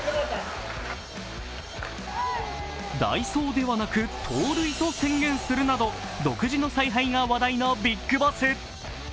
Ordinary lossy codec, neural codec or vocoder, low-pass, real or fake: none; none; none; real